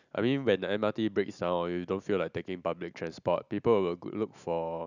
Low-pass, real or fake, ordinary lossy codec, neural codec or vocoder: 7.2 kHz; real; none; none